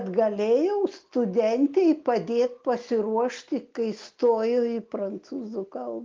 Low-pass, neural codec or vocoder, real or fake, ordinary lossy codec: 7.2 kHz; none; real; Opus, 32 kbps